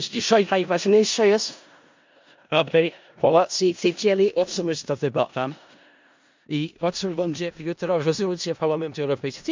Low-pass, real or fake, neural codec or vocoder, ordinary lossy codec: 7.2 kHz; fake; codec, 16 kHz in and 24 kHz out, 0.4 kbps, LongCat-Audio-Codec, four codebook decoder; MP3, 64 kbps